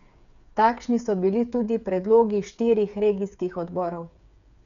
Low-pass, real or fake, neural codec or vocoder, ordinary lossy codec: 7.2 kHz; fake; codec, 16 kHz, 8 kbps, FreqCodec, smaller model; none